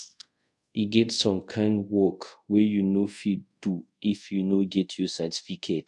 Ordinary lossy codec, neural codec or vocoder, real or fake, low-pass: AAC, 64 kbps; codec, 24 kHz, 0.5 kbps, DualCodec; fake; 10.8 kHz